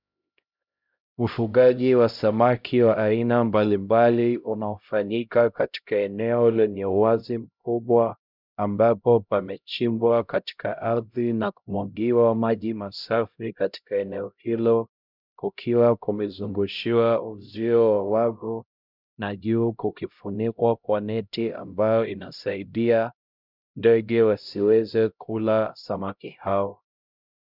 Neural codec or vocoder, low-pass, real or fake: codec, 16 kHz, 0.5 kbps, X-Codec, HuBERT features, trained on LibriSpeech; 5.4 kHz; fake